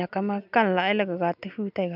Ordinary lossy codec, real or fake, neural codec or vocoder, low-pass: AAC, 48 kbps; real; none; 5.4 kHz